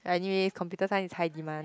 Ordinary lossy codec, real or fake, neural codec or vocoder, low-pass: none; real; none; none